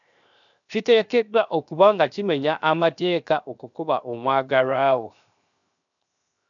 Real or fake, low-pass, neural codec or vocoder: fake; 7.2 kHz; codec, 16 kHz, 0.7 kbps, FocalCodec